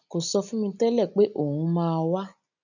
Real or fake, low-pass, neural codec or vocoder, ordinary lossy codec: real; 7.2 kHz; none; none